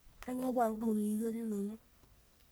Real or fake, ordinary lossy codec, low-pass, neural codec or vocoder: fake; none; none; codec, 44.1 kHz, 1.7 kbps, Pupu-Codec